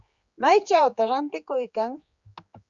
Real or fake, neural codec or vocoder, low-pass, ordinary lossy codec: fake; codec, 16 kHz, 4 kbps, X-Codec, HuBERT features, trained on general audio; 7.2 kHz; Opus, 64 kbps